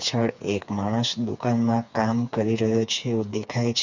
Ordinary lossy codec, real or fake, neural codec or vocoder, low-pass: none; fake; codec, 16 kHz, 4 kbps, FreqCodec, smaller model; 7.2 kHz